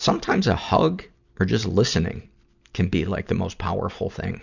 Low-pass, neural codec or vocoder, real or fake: 7.2 kHz; none; real